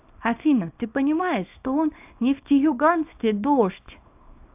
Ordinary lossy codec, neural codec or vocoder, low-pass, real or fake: AAC, 32 kbps; codec, 24 kHz, 0.9 kbps, WavTokenizer, small release; 3.6 kHz; fake